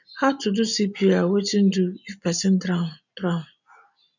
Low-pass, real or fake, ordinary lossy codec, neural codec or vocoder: 7.2 kHz; real; none; none